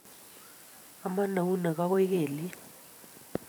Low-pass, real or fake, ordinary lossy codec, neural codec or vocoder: none; fake; none; vocoder, 44.1 kHz, 128 mel bands every 512 samples, BigVGAN v2